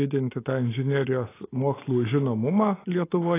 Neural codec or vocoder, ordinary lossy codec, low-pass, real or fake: none; AAC, 16 kbps; 3.6 kHz; real